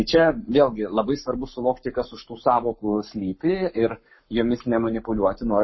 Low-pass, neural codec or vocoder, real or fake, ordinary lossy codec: 7.2 kHz; codec, 44.1 kHz, 7.8 kbps, Pupu-Codec; fake; MP3, 24 kbps